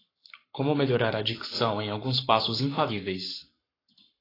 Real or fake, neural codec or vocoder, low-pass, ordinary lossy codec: fake; codec, 44.1 kHz, 7.8 kbps, Pupu-Codec; 5.4 kHz; AAC, 24 kbps